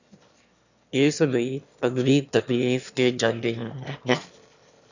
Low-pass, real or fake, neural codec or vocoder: 7.2 kHz; fake; autoencoder, 22.05 kHz, a latent of 192 numbers a frame, VITS, trained on one speaker